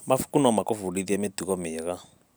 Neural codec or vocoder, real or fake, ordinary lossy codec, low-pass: none; real; none; none